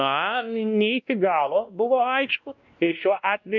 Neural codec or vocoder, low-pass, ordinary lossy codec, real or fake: codec, 16 kHz, 1 kbps, X-Codec, WavLM features, trained on Multilingual LibriSpeech; 7.2 kHz; MP3, 64 kbps; fake